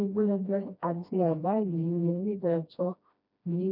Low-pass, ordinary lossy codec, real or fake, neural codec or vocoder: 5.4 kHz; none; fake; codec, 16 kHz, 1 kbps, FreqCodec, smaller model